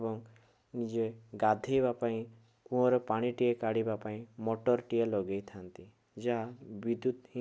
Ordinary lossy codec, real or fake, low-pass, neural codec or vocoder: none; real; none; none